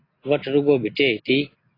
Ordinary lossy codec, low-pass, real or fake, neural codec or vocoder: AAC, 24 kbps; 5.4 kHz; real; none